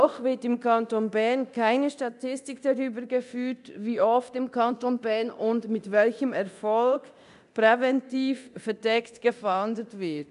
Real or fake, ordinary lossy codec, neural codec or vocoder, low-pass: fake; none; codec, 24 kHz, 0.9 kbps, DualCodec; 10.8 kHz